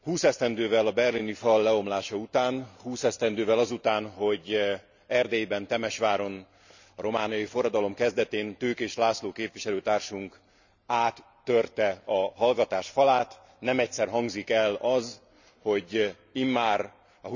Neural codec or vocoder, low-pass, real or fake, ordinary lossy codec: none; 7.2 kHz; real; none